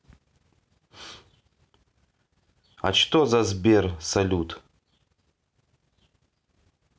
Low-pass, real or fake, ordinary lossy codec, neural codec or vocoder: none; real; none; none